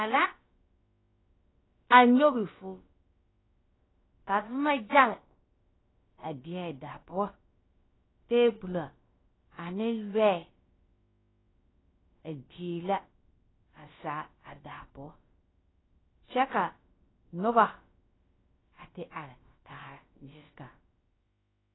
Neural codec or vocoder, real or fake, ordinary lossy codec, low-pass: codec, 16 kHz, about 1 kbps, DyCAST, with the encoder's durations; fake; AAC, 16 kbps; 7.2 kHz